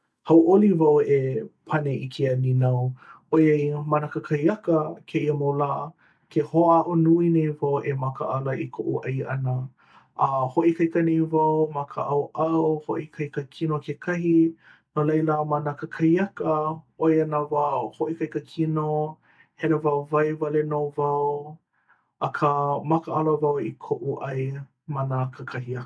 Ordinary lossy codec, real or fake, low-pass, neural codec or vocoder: none; real; none; none